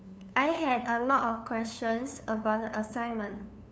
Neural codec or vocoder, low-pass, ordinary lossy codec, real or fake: codec, 16 kHz, 2 kbps, FunCodec, trained on LibriTTS, 25 frames a second; none; none; fake